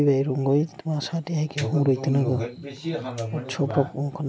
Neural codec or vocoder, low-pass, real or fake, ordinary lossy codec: none; none; real; none